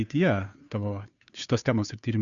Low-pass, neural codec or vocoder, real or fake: 7.2 kHz; codec, 16 kHz, 2 kbps, FunCodec, trained on Chinese and English, 25 frames a second; fake